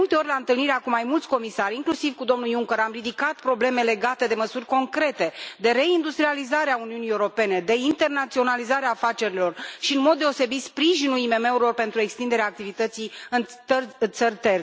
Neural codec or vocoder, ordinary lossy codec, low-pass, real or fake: none; none; none; real